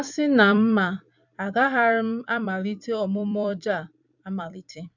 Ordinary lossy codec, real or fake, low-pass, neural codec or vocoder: none; fake; 7.2 kHz; vocoder, 44.1 kHz, 128 mel bands every 256 samples, BigVGAN v2